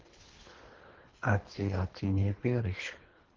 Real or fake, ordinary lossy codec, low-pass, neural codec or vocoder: fake; Opus, 16 kbps; 7.2 kHz; codec, 24 kHz, 3 kbps, HILCodec